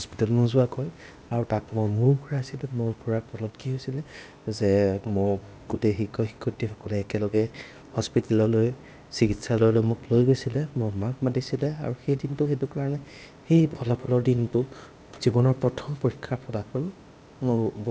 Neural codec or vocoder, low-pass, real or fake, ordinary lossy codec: codec, 16 kHz, 0.8 kbps, ZipCodec; none; fake; none